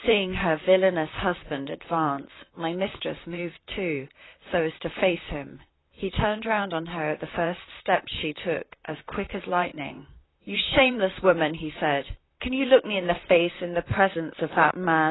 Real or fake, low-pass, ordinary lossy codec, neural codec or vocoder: fake; 7.2 kHz; AAC, 16 kbps; vocoder, 44.1 kHz, 128 mel bands, Pupu-Vocoder